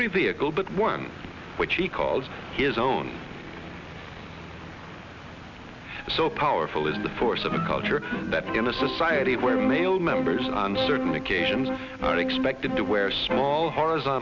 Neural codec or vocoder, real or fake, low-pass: none; real; 7.2 kHz